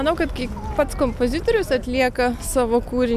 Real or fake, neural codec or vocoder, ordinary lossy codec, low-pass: real; none; MP3, 96 kbps; 14.4 kHz